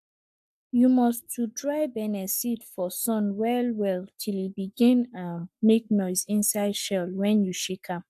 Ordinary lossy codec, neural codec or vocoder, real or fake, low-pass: AAC, 96 kbps; codec, 44.1 kHz, 7.8 kbps, Pupu-Codec; fake; 14.4 kHz